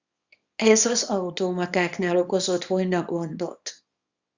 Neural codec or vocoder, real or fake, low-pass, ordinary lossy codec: codec, 24 kHz, 0.9 kbps, WavTokenizer, small release; fake; 7.2 kHz; Opus, 64 kbps